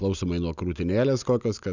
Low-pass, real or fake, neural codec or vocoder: 7.2 kHz; real; none